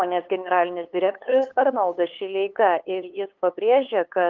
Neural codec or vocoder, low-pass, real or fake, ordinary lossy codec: codec, 16 kHz, 8 kbps, FunCodec, trained on LibriTTS, 25 frames a second; 7.2 kHz; fake; Opus, 32 kbps